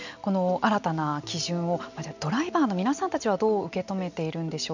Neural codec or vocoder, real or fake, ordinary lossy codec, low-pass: none; real; none; 7.2 kHz